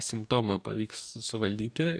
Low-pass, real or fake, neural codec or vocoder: 9.9 kHz; fake; codec, 44.1 kHz, 2.6 kbps, DAC